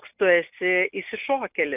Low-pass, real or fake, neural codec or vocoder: 3.6 kHz; real; none